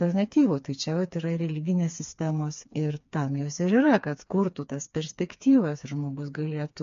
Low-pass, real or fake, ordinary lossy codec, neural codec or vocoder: 7.2 kHz; fake; MP3, 48 kbps; codec, 16 kHz, 4 kbps, FreqCodec, smaller model